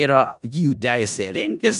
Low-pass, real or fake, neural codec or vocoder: 10.8 kHz; fake; codec, 16 kHz in and 24 kHz out, 0.4 kbps, LongCat-Audio-Codec, four codebook decoder